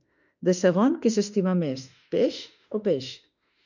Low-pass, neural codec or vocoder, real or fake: 7.2 kHz; autoencoder, 48 kHz, 32 numbers a frame, DAC-VAE, trained on Japanese speech; fake